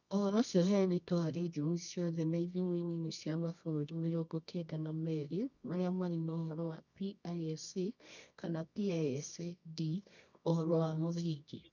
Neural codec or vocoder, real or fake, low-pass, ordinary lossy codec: codec, 24 kHz, 0.9 kbps, WavTokenizer, medium music audio release; fake; 7.2 kHz; none